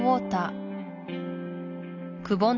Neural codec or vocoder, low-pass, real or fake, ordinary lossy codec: none; 7.2 kHz; real; none